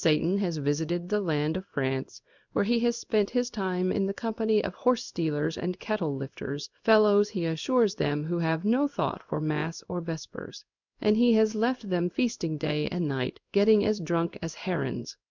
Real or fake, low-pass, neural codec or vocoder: fake; 7.2 kHz; codec, 16 kHz in and 24 kHz out, 1 kbps, XY-Tokenizer